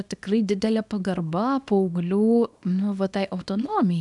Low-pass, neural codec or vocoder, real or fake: 10.8 kHz; codec, 24 kHz, 0.9 kbps, WavTokenizer, small release; fake